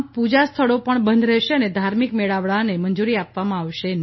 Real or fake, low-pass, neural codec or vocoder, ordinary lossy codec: real; 7.2 kHz; none; MP3, 24 kbps